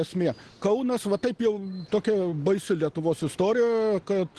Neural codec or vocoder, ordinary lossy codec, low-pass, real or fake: none; Opus, 16 kbps; 10.8 kHz; real